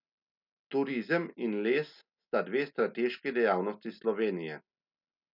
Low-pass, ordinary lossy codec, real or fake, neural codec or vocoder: 5.4 kHz; none; real; none